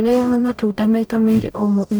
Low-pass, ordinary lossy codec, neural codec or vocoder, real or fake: none; none; codec, 44.1 kHz, 0.9 kbps, DAC; fake